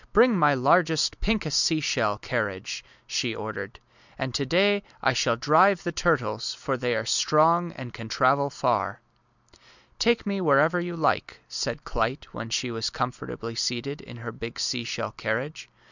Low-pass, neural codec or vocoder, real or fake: 7.2 kHz; none; real